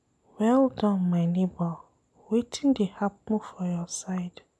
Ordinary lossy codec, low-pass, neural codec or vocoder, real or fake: none; none; none; real